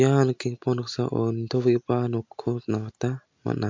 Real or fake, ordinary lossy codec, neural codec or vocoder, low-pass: real; MP3, 64 kbps; none; 7.2 kHz